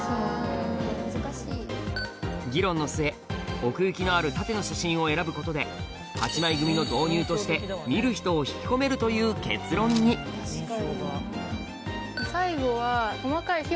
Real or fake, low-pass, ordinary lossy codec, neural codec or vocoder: real; none; none; none